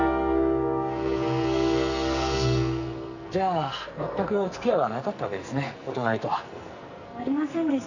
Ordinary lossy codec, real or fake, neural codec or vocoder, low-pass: none; fake; codec, 44.1 kHz, 2.6 kbps, SNAC; 7.2 kHz